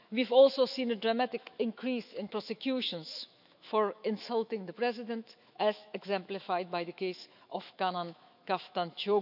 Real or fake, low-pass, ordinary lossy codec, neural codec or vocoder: fake; 5.4 kHz; none; autoencoder, 48 kHz, 128 numbers a frame, DAC-VAE, trained on Japanese speech